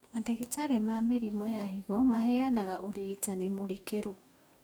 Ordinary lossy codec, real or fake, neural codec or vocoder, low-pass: none; fake; codec, 44.1 kHz, 2.6 kbps, DAC; none